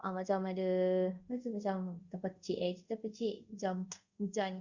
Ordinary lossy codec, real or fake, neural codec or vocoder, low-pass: Opus, 64 kbps; fake; codec, 24 kHz, 0.5 kbps, DualCodec; 7.2 kHz